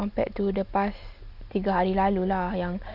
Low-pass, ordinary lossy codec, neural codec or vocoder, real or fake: 5.4 kHz; Opus, 64 kbps; none; real